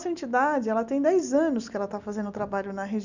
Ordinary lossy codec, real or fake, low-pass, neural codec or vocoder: none; real; 7.2 kHz; none